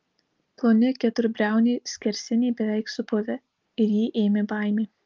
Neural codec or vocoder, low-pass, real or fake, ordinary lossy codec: none; 7.2 kHz; real; Opus, 32 kbps